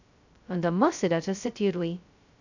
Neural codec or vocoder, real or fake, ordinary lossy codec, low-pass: codec, 16 kHz, 0.2 kbps, FocalCodec; fake; none; 7.2 kHz